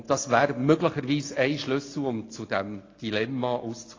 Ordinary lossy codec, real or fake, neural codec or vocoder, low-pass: AAC, 32 kbps; real; none; 7.2 kHz